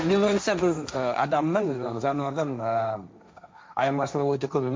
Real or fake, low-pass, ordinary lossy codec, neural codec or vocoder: fake; none; none; codec, 16 kHz, 1.1 kbps, Voila-Tokenizer